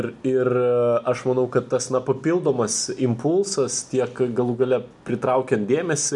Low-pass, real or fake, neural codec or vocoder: 10.8 kHz; real; none